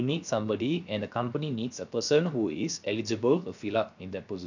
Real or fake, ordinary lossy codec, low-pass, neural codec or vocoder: fake; none; 7.2 kHz; codec, 16 kHz, 0.7 kbps, FocalCodec